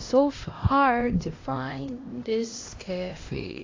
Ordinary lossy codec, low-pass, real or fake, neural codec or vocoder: AAC, 32 kbps; 7.2 kHz; fake; codec, 16 kHz, 1 kbps, X-Codec, HuBERT features, trained on LibriSpeech